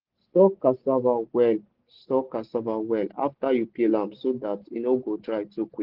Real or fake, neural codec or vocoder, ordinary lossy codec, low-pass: real; none; Opus, 16 kbps; 5.4 kHz